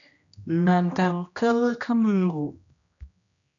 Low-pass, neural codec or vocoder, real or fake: 7.2 kHz; codec, 16 kHz, 1 kbps, X-Codec, HuBERT features, trained on general audio; fake